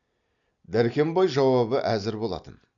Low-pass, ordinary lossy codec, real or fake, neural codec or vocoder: 7.2 kHz; Opus, 64 kbps; real; none